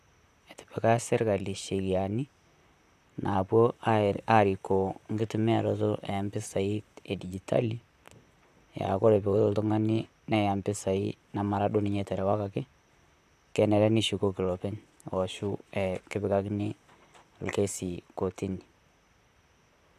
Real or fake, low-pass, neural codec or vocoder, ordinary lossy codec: fake; 14.4 kHz; vocoder, 44.1 kHz, 128 mel bands, Pupu-Vocoder; none